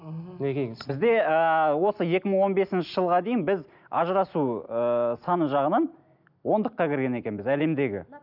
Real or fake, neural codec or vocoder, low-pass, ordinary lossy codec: real; none; 5.4 kHz; none